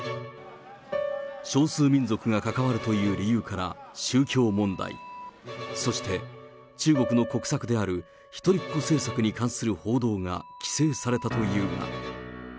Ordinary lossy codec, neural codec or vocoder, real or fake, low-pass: none; none; real; none